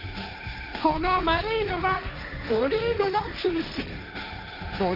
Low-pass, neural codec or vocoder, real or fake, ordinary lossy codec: 5.4 kHz; codec, 16 kHz, 1.1 kbps, Voila-Tokenizer; fake; none